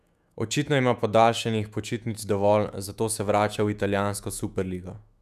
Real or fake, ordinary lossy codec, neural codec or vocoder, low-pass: fake; none; autoencoder, 48 kHz, 128 numbers a frame, DAC-VAE, trained on Japanese speech; 14.4 kHz